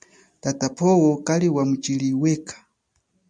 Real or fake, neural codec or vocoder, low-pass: real; none; 9.9 kHz